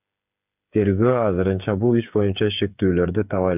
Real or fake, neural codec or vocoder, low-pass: fake; codec, 16 kHz, 8 kbps, FreqCodec, smaller model; 3.6 kHz